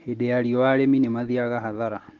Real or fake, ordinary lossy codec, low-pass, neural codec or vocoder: real; Opus, 16 kbps; 7.2 kHz; none